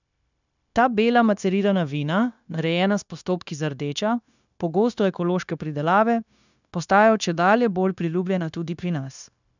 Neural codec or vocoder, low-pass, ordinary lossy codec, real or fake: codec, 16 kHz, 0.9 kbps, LongCat-Audio-Codec; 7.2 kHz; none; fake